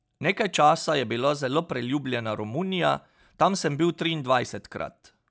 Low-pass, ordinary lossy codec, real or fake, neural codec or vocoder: none; none; real; none